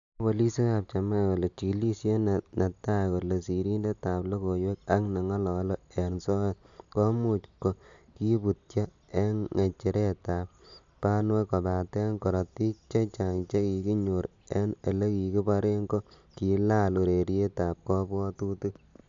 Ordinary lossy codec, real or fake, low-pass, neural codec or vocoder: AAC, 64 kbps; real; 7.2 kHz; none